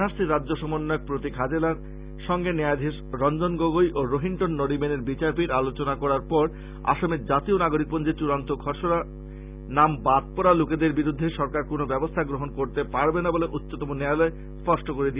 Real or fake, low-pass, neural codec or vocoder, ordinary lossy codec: real; 3.6 kHz; none; Opus, 64 kbps